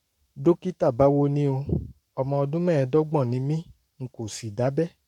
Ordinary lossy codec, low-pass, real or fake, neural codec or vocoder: none; 19.8 kHz; fake; codec, 44.1 kHz, 7.8 kbps, Pupu-Codec